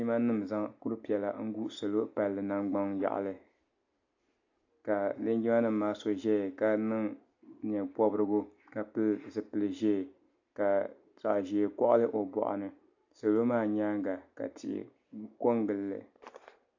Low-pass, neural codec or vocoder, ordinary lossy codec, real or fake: 7.2 kHz; none; AAC, 48 kbps; real